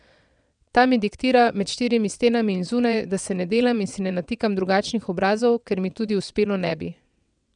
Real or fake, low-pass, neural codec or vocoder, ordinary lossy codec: fake; 9.9 kHz; vocoder, 22.05 kHz, 80 mel bands, WaveNeXt; none